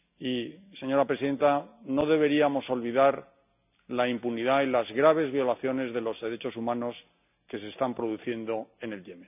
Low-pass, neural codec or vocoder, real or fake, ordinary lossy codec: 3.6 kHz; none; real; none